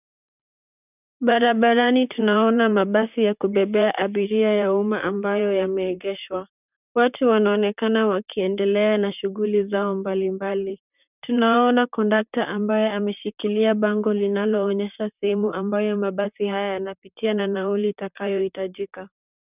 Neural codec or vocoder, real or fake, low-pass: vocoder, 44.1 kHz, 128 mel bands, Pupu-Vocoder; fake; 3.6 kHz